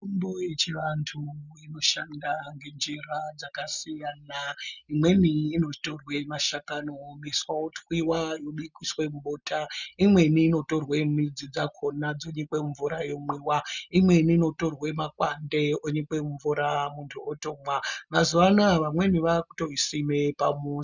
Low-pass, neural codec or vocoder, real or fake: 7.2 kHz; none; real